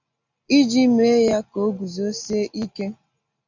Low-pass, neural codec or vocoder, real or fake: 7.2 kHz; none; real